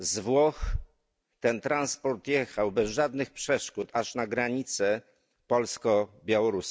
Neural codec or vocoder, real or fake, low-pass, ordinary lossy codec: none; real; none; none